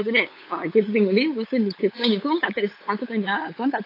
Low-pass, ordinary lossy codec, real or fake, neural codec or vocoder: 5.4 kHz; AAC, 32 kbps; fake; codec, 16 kHz, 8 kbps, FunCodec, trained on LibriTTS, 25 frames a second